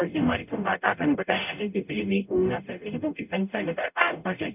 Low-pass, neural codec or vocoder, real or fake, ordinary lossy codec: 3.6 kHz; codec, 44.1 kHz, 0.9 kbps, DAC; fake; none